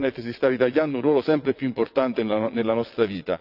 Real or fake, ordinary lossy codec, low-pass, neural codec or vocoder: fake; none; 5.4 kHz; vocoder, 22.05 kHz, 80 mel bands, WaveNeXt